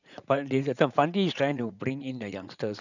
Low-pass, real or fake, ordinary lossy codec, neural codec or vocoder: 7.2 kHz; fake; none; vocoder, 44.1 kHz, 80 mel bands, Vocos